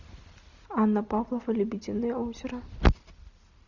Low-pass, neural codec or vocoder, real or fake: 7.2 kHz; none; real